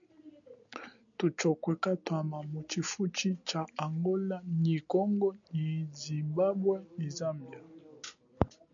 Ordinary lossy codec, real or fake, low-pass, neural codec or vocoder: MP3, 96 kbps; real; 7.2 kHz; none